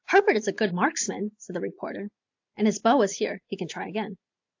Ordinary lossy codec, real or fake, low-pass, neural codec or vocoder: AAC, 48 kbps; real; 7.2 kHz; none